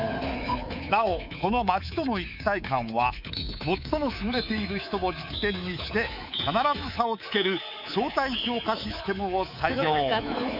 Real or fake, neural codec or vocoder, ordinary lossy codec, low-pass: fake; codec, 24 kHz, 3.1 kbps, DualCodec; none; 5.4 kHz